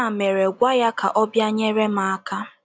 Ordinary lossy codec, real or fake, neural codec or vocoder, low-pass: none; real; none; none